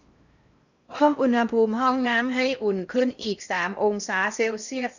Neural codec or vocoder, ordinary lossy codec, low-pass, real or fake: codec, 16 kHz in and 24 kHz out, 0.6 kbps, FocalCodec, streaming, 4096 codes; none; 7.2 kHz; fake